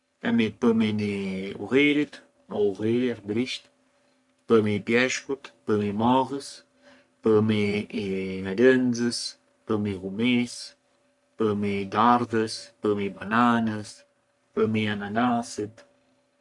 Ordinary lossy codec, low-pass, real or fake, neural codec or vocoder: none; 10.8 kHz; fake; codec, 44.1 kHz, 3.4 kbps, Pupu-Codec